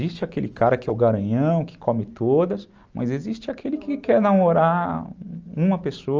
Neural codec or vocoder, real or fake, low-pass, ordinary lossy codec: none; real; 7.2 kHz; Opus, 24 kbps